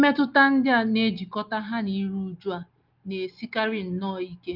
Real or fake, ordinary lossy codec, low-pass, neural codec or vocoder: real; Opus, 24 kbps; 5.4 kHz; none